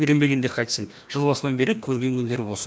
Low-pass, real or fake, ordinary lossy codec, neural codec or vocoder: none; fake; none; codec, 16 kHz, 1 kbps, FreqCodec, larger model